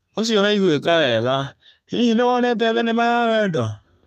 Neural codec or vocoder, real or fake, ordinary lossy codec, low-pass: codec, 32 kHz, 1.9 kbps, SNAC; fake; none; 14.4 kHz